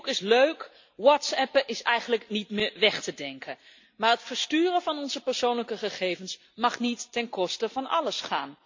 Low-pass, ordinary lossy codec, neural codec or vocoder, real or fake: 7.2 kHz; MP3, 48 kbps; none; real